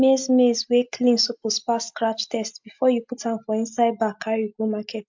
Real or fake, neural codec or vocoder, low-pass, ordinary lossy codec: real; none; 7.2 kHz; MP3, 64 kbps